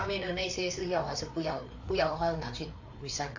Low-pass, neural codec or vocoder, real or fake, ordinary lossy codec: 7.2 kHz; codec, 16 kHz, 4 kbps, FreqCodec, larger model; fake; AAC, 48 kbps